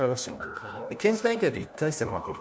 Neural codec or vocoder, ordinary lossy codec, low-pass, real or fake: codec, 16 kHz, 1 kbps, FunCodec, trained on LibriTTS, 50 frames a second; none; none; fake